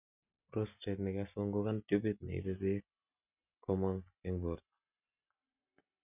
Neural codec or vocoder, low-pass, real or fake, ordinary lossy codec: none; 3.6 kHz; real; none